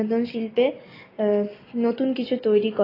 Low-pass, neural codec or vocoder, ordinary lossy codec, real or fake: 5.4 kHz; none; AAC, 24 kbps; real